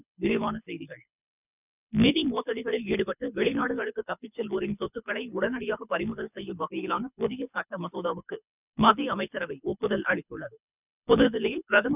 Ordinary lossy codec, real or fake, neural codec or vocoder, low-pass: none; fake; codec, 24 kHz, 6 kbps, HILCodec; 3.6 kHz